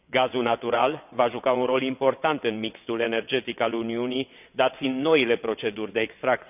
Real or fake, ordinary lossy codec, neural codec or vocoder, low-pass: fake; none; vocoder, 22.05 kHz, 80 mel bands, WaveNeXt; 3.6 kHz